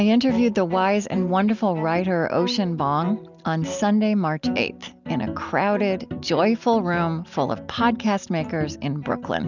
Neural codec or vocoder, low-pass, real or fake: none; 7.2 kHz; real